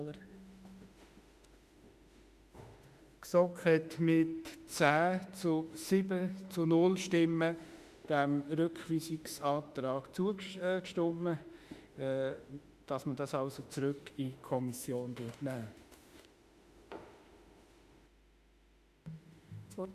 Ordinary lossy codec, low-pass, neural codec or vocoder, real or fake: Opus, 64 kbps; 14.4 kHz; autoencoder, 48 kHz, 32 numbers a frame, DAC-VAE, trained on Japanese speech; fake